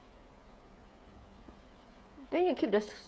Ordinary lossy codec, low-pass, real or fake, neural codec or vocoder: none; none; fake; codec, 16 kHz, 16 kbps, FreqCodec, smaller model